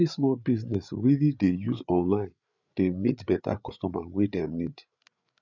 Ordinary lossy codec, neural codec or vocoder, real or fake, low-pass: none; codec, 16 kHz, 8 kbps, FreqCodec, larger model; fake; 7.2 kHz